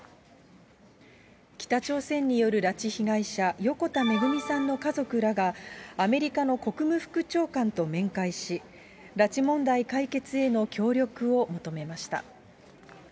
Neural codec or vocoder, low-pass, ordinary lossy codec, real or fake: none; none; none; real